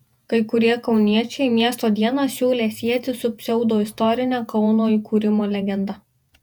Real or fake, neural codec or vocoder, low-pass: fake; vocoder, 48 kHz, 128 mel bands, Vocos; 19.8 kHz